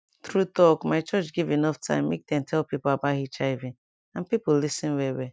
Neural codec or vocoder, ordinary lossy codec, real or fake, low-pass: none; none; real; none